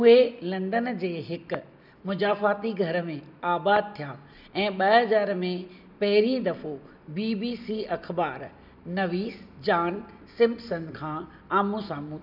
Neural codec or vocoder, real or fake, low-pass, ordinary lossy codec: none; real; 5.4 kHz; none